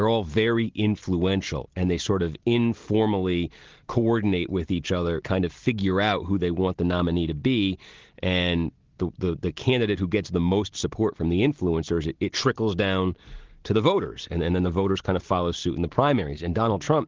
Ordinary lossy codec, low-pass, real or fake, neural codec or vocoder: Opus, 16 kbps; 7.2 kHz; real; none